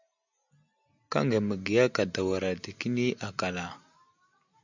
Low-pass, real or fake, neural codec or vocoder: 7.2 kHz; real; none